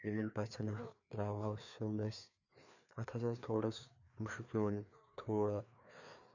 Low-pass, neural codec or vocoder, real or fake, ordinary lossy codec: 7.2 kHz; codec, 16 kHz, 2 kbps, FreqCodec, larger model; fake; none